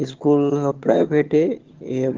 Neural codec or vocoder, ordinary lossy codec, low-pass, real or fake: vocoder, 22.05 kHz, 80 mel bands, HiFi-GAN; Opus, 16 kbps; 7.2 kHz; fake